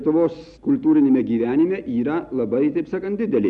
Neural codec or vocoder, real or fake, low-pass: none; real; 10.8 kHz